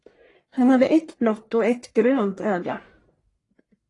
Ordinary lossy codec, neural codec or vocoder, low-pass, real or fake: AAC, 32 kbps; codec, 44.1 kHz, 1.7 kbps, Pupu-Codec; 10.8 kHz; fake